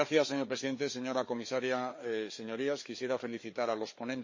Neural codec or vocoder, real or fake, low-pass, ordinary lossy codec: codec, 16 kHz, 4 kbps, FreqCodec, larger model; fake; 7.2 kHz; MP3, 32 kbps